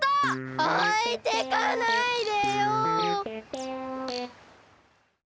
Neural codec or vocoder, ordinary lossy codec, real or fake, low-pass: none; none; real; none